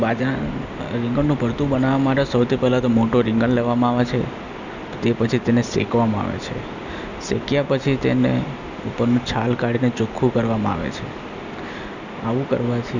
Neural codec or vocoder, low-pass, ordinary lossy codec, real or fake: none; 7.2 kHz; none; real